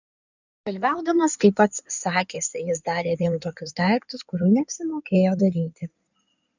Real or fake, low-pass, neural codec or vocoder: fake; 7.2 kHz; codec, 16 kHz in and 24 kHz out, 2.2 kbps, FireRedTTS-2 codec